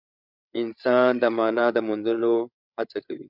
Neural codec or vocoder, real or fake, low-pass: codec, 16 kHz, 8 kbps, FreqCodec, larger model; fake; 5.4 kHz